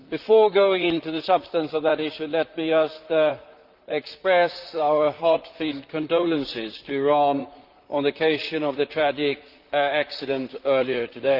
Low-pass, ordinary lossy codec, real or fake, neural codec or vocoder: 5.4 kHz; Opus, 32 kbps; fake; vocoder, 44.1 kHz, 128 mel bands, Pupu-Vocoder